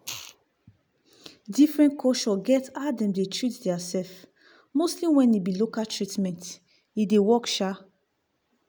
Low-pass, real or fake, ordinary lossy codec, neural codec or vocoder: none; real; none; none